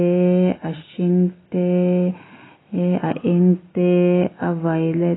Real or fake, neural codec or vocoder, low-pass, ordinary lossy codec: real; none; 7.2 kHz; AAC, 16 kbps